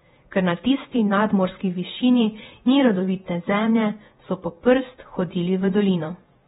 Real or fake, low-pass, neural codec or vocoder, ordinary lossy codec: fake; 19.8 kHz; vocoder, 48 kHz, 128 mel bands, Vocos; AAC, 16 kbps